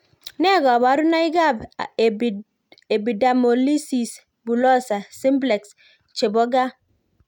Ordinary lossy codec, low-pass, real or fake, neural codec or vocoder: none; 19.8 kHz; real; none